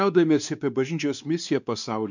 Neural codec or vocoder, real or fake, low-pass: codec, 16 kHz, 2 kbps, X-Codec, WavLM features, trained on Multilingual LibriSpeech; fake; 7.2 kHz